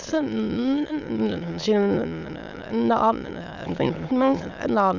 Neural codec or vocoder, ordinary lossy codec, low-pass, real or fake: autoencoder, 22.05 kHz, a latent of 192 numbers a frame, VITS, trained on many speakers; none; 7.2 kHz; fake